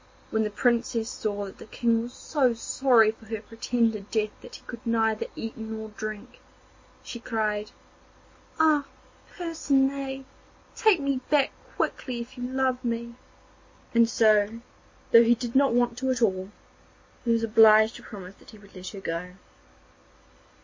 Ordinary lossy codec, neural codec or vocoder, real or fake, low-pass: MP3, 32 kbps; none; real; 7.2 kHz